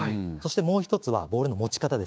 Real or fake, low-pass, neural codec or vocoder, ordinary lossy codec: fake; none; codec, 16 kHz, 6 kbps, DAC; none